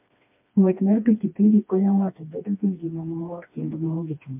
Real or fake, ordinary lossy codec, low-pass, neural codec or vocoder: fake; MP3, 32 kbps; 3.6 kHz; codec, 16 kHz, 2 kbps, FreqCodec, smaller model